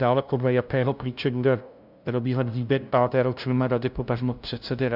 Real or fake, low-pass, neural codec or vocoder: fake; 5.4 kHz; codec, 16 kHz, 0.5 kbps, FunCodec, trained on LibriTTS, 25 frames a second